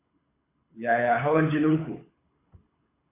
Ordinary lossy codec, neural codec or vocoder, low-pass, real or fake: MP3, 24 kbps; codec, 24 kHz, 6 kbps, HILCodec; 3.6 kHz; fake